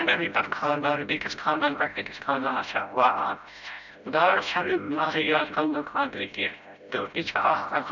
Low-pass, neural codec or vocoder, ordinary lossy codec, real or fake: 7.2 kHz; codec, 16 kHz, 0.5 kbps, FreqCodec, smaller model; none; fake